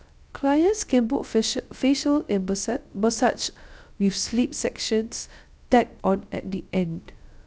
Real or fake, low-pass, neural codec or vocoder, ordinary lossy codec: fake; none; codec, 16 kHz, 0.3 kbps, FocalCodec; none